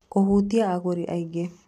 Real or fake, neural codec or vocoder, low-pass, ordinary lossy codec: real; none; 14.4 kHz; none